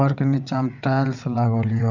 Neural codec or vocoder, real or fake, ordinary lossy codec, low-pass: vocoder, 22.05 kHz, 80 mel bands, WaveNeXt; fake; none; 7.2 kHz